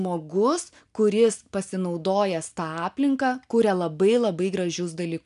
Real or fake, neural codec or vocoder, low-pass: real; none; 10.8 kHz